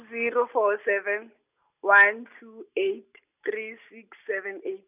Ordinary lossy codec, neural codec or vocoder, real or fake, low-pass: none; none; real; 3.6 kHz